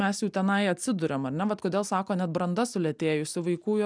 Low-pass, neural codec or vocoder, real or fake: 9.9 kHz; none; real